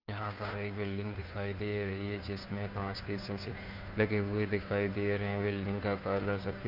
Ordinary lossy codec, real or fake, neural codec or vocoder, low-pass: none; fake; codec, 16 kHz, 2 kbps, FunCodec, trained on Chinese and English, 25 frames a second; 5.4 kHz